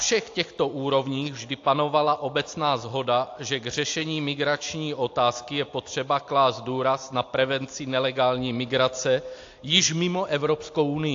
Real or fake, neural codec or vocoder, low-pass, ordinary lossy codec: real; none; 7.2 kHz; AAC, 48 kbps